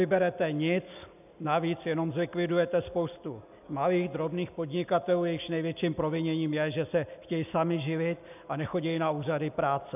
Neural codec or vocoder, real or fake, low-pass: none; real; 3.6 kHz